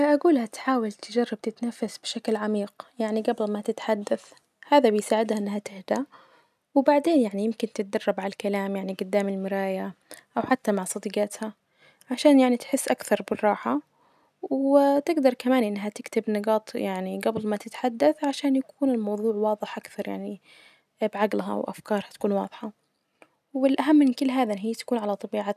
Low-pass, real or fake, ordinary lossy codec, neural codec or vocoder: 14.4 kHz; real; none; none